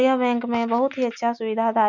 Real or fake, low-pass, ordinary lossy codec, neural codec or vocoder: real; 7.2 kHz; none; none